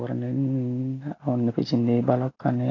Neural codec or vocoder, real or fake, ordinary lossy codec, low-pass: none; real; AAC, 32 kbps; 7.2 kHz